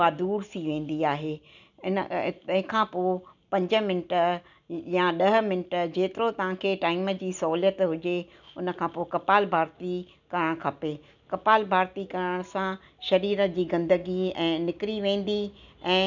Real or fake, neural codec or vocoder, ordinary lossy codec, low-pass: real; none; none; 7.2 kHz